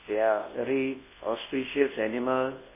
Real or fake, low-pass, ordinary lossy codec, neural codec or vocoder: fake; 3.6 kHz; MP3, 16 kbps; codec, 24 kHz, 0.9 kbps, WavTokenizer, large speech release